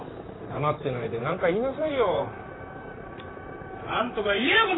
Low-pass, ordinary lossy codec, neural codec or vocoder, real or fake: 7.2 kHz; AAC, 16 kbps; vocoder, 44.1 kHz, 128 mel bands, Pupu-Vocoder; fake